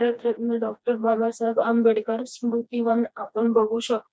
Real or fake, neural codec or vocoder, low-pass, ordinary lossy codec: fake; codec, 16 kHz, 2 kbps, FreqCodec, smaller model; none; none